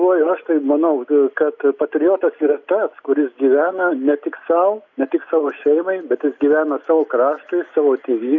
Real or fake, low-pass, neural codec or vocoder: real; 7.2 kHz; none